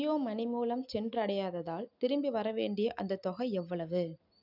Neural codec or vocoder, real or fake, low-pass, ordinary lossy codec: none; real; 5.4 kHz; none